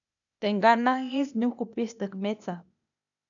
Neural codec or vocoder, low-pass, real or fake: codec, 16 kHz, 0.8 kbps, ZipCodec; 7.2 kHz; fake